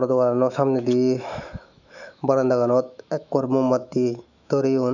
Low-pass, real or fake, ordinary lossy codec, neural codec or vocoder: 7.2 kHz; fake; none; autoencoder, 48 kHz, 128 numbers a frame, DAC-VAE, trained on Japanese speech